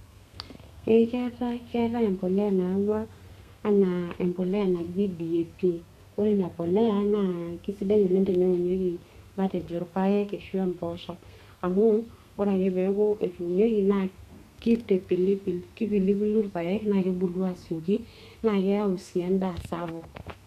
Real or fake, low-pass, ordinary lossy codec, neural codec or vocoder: fake; 14.4 kHz; none; codec, 32 kHz, 1.9 kbps, SNAC